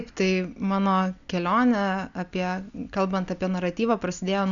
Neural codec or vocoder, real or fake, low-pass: none; real; 7.2 kHz